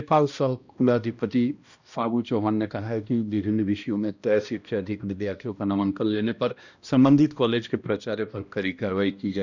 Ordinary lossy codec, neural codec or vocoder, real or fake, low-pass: none; codec, 16 kHz, 1 kbps, X-Codec, HuBERT features, trained on balanced general audio; fake; 7.2 kHz